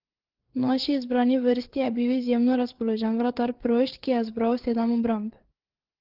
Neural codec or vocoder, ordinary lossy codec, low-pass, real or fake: codec, 16 kHz, 4 kbps, FreqCodec, larger model; Opus, 16 kbps; 5.4 kHz; fake